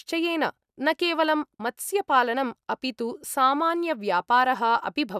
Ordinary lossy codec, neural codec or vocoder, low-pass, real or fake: none; none; 14.4 kHz; real